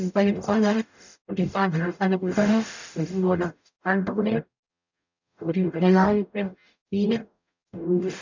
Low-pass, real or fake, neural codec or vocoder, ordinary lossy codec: 7.2 kHz; fake; codec, 44.1 kHz, 0.9 kbps, DAC; none